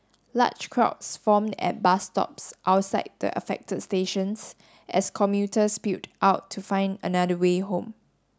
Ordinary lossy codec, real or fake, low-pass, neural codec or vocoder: none; real; none; none